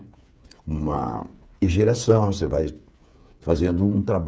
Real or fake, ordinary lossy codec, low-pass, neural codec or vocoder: fake; none; none; codec, 16 kHz, 8 kbps, FreqCodec, smaller model